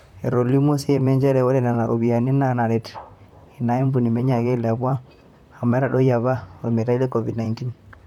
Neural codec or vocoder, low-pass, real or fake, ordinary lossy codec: vocoder, 44.1 kHz, 128 mel bands, Pupu-Vocoder; 19.8 kHz; fake; MP3, 96 kbps